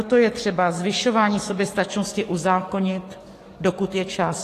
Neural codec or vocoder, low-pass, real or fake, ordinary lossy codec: codec, 44.1 kHz, 7.8 kbps, DAC; 14.4 kHz; fake; AAC, 48 kbps